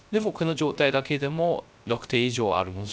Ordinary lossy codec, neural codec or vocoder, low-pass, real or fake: none; codec, 16 kHz, 0.3 kbps, FocalCodec; none; fake